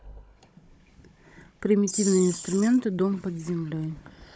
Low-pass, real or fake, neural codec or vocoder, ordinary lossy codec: none; fake; codec, 16 kHz, 16 kbps, FunCodec, trained on Chinese and English, 50 frames a second; none